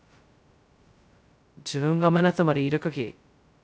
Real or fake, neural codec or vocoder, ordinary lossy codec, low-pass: fake; codec, 16 kHz, 0.2 kbps, FocalCodec; none; none